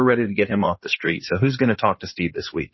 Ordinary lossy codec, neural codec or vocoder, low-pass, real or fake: MP3, 24 kbps; codec, 16 kHz in and 24 kHz out, 2.2 kbps, FireRedTTS-2 codec; 7.2 kHz; fake